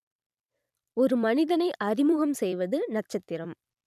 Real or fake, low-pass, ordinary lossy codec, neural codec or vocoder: fake; 14.4 kHz; none; vocoder, 44.1 kHz, 128 mel bands every 256 samples, BigVGAN v2